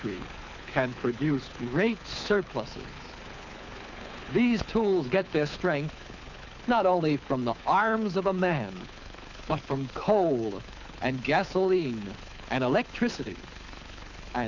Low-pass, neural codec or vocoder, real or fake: 7.2 kHz; codec, 24 kHz, 6 kbps, HILCodec; fake